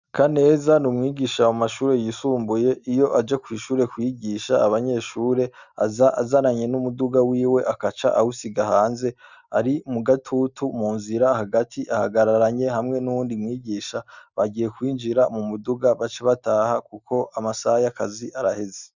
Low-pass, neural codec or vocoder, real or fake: 7.2 kHz; none; real